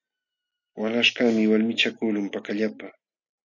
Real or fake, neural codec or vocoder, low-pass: real; none; 7.2 kHz